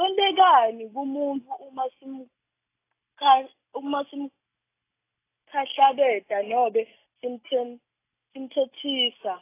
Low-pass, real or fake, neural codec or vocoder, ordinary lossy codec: 3.6 kHz; real; none; AAC, 24 kbps